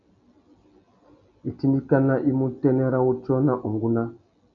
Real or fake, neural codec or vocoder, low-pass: real; none; 7.2 kHz